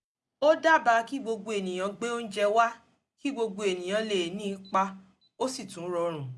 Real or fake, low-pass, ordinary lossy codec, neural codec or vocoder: real; none; none; none